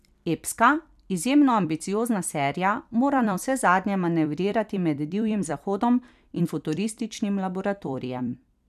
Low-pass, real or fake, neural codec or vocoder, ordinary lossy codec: 14.4 kHz; fake; vocoder, 44.1 kHz, 128 mel bands every 256 samples, BigVGAN v2; none